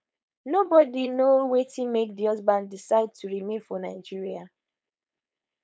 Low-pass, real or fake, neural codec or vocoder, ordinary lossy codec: none; fake; codec, 16 kHz, 4.8 kbps, FACodec; none